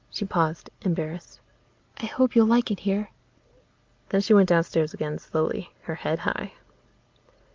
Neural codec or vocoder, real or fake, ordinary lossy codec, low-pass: none; real; Opus, 32 kbps; 7.2 kHz